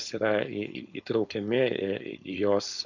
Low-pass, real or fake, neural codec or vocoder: 7.2 kHz; fake; codec, 16 kHz, 4.8 kbps, FACodec